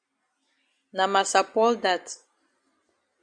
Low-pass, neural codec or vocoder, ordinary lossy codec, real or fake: 9.9 kHz; none; Opus, 64 kbps; real